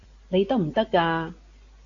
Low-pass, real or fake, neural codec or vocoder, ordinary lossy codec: 7.2 kHz; real; none; AAC, 48 kbps